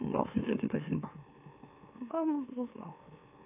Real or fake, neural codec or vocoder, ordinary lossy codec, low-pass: fake; autoencoder, 44.1 kHz, a latent of 192 numbers a frame, MeloTTS; AAC, 32 kbps; 3.6 kHz